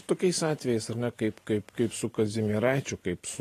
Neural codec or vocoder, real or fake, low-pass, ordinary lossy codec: vocoder, 44.1 kHz, 128 mel bands every 512 samples, BigVGAN v2; fake; 14.4 kHz; AAC, 48 kbps